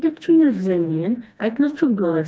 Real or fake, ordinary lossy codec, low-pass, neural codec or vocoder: fake; none; none; codec, 16 kHz, 1 kbps, FreqCodec, smaller model